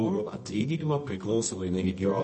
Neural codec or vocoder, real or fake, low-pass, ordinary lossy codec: codec, 24 kHz, 0.9 kbps, WavTokenizer, medium music audio release; fake; 10.8 kHz; MP3, 32 kbps